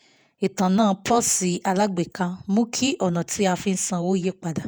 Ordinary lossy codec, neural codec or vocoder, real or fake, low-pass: none; vocoder, 48 kHz, 128 mel bands, Vocos; fake; none